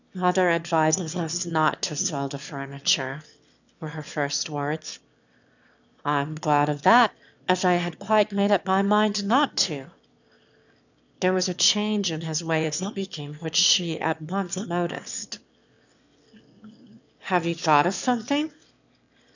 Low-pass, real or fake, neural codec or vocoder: 7.2 kHz; fake; autoencoder, 22.05 kHz, a latent of 192 numbers a frame, VITS, trained on one speaker